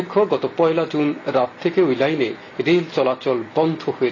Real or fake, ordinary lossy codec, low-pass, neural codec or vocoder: real; AAC, 32 kbps; 7.2 kHz; none